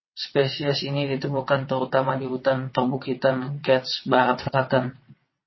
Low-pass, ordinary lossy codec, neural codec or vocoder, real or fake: 7.2 kHz; MP3, 24 kbps; vocoder, 22.05 kHz, 80 mel bands, WaveNeXt; fake